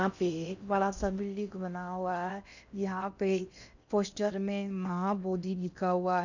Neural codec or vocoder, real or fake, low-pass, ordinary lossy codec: codec, 16 kHz in and 24 kHz out, 0.6 kbps, FocalCodec, streaming, 4096 codes; fake; 7.2 kHz; none